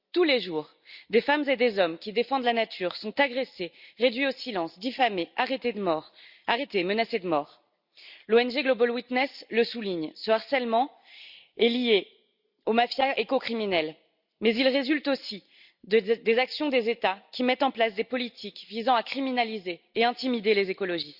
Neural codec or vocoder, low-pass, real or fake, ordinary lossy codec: none; 5.4 kHz; real; Opus, 64 kbps